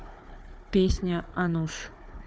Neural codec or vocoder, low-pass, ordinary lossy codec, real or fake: codec, 16 kHz, 4 kbps, FunCodec, trained on Chinese and English, 50 frames a second; none; none; fake